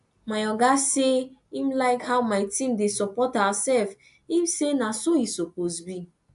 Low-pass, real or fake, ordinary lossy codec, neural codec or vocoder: 10.8 kHz; real; AAC, 96 kbps; none